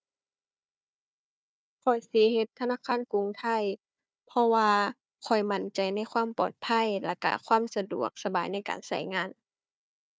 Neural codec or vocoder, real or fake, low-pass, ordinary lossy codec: codec, 16 kHz, 4 kbps, FunCodec, trained on Chinese and English, 50 frames a second; fake; none; none